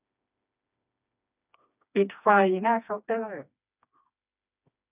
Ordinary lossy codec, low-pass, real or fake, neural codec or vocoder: none; 3.6 kHz; fake; codec, 16 kHz, 2 kbps, FreqCodec, smaller model